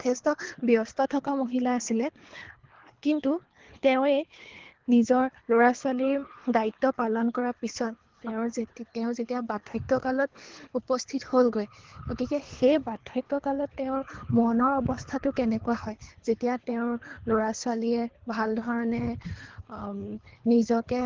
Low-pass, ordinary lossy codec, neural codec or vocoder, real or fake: 7.2 kHz; Opus, 16 kbps; codec, 24 kHz, 3 kbps, HILCodec; fake